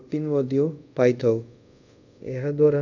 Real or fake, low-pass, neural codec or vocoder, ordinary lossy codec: fake; 7.2 kHz; codec, 24 kHz, 0.5 kbps, DualCodec; none